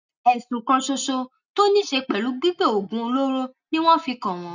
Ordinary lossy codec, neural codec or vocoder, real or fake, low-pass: none; none; real; 7.2 kHz